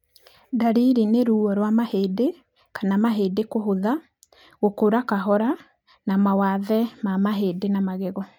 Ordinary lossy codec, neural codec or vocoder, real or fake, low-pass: none; none; real; 19.8 kHz